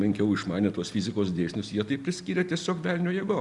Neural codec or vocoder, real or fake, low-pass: none; real; 10.8 kHz